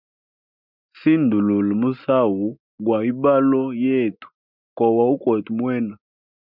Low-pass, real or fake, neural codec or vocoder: 5.4 kHz; real; none